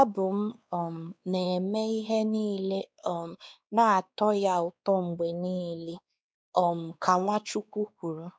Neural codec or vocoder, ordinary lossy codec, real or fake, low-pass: codec, 16 kHz, 2 kbps, X-Codec, WavLM features, trained on Multilingual LibriSpeech; none; fake; none